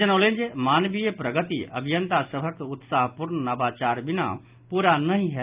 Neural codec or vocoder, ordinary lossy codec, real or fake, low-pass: none; Opus, 24 kbps; real; 3.6 kHz